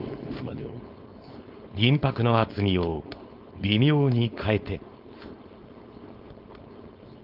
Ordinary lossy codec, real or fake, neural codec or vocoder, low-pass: Opus, 24 kbps; fake; codec, 16 kHz, 4.8 kbps, FACodec; 5.4 kHz